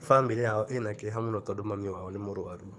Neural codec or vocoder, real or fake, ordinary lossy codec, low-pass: codec, 24 kHz, 6 kbps, HILCodec; fake; none; none